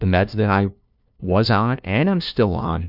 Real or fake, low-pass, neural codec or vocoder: fake; 5.4 kHz; codec, 16 kHz, 1 kbps, FunCodec, trained on LibriTTS, 50 frames a second